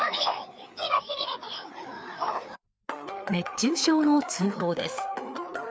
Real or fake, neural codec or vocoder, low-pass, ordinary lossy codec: fake; codec, 16 kHz, 4 kbps, FreqCodec, larger model; none; none